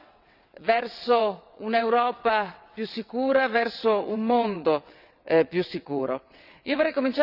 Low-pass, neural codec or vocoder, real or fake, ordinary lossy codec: 5.4 kHz; vocoder, 22.05 kHz, 80 mel bands, WaveNeXt; fake; none